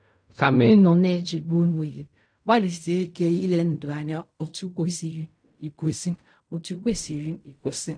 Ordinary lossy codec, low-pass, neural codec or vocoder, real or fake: MP3, 96 kbps; 9.9 kHz; codec, 16 kHz in and 24 kHz out, 0.4 kbps, LongCat-Audio-Codec, fine tuned four codebook decoder; fake